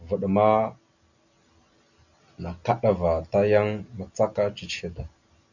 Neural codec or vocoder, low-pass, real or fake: none; 7.2 kHz; real